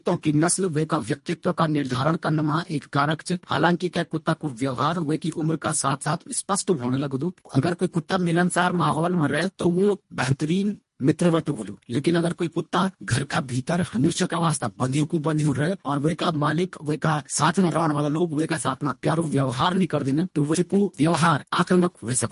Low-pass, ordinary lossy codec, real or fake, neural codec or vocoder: 10.8 kHz; MP3, 48 kbps; fake; codec, 24 kHz, 1.5 kbps, HILCodec